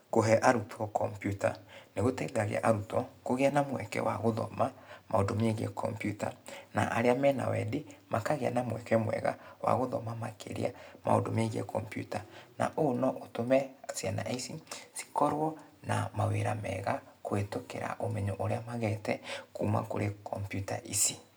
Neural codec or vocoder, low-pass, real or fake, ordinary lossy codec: none; none; real; none